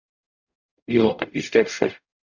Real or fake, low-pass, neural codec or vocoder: fake; 7.2 kHz; codec, 44.1 kHz, 0.9 kbps, DAC